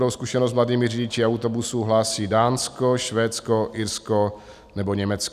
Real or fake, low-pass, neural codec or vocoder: real; 14.4 kHz; none